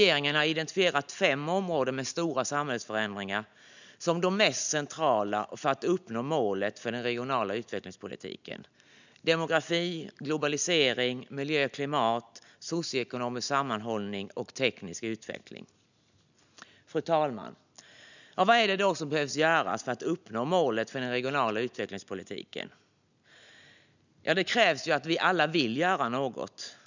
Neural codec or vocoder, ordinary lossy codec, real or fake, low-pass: none; none; real; 7.2 kHz